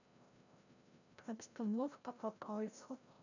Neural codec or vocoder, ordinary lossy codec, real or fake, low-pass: codec, 16 kHz, 0.5 kbps, FreqCodec, larger model; none; fake; 7.2 kHz